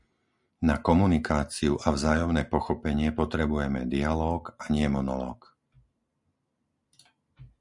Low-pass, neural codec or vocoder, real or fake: 10.8 kHz; none; real